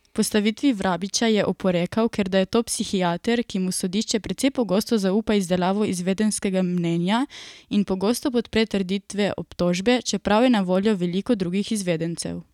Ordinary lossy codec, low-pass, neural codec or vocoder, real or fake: none; 19.8 kHz; none; real